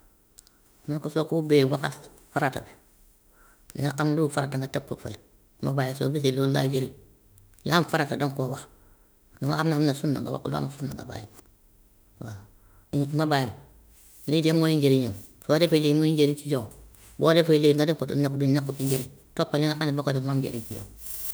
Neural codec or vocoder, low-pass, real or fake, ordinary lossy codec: autoencoder, 48 kHz, 32 numbers a frame, DAC-VAE, trained on Japanese speech; none; fake; none